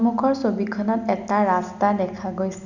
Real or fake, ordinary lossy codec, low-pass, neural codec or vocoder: real; none; 7.2 kHz; none